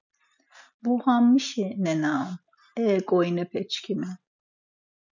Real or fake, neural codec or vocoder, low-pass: real; none; 7.2 kHz